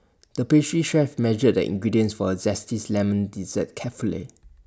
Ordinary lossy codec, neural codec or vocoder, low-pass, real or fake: none; none; none; real